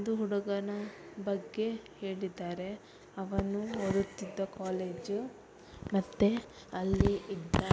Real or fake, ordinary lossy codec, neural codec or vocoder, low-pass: real; none; none; none